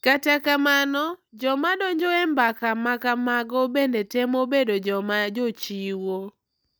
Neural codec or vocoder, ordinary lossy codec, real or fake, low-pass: none; none; real; none